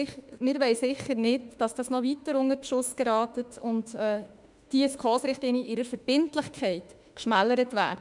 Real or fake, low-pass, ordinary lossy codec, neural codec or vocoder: fake; 10.8 kHz; none; autoencoder, 48 kHz, 32 numbers a frame, DAC-VAE, trained on Japanese speech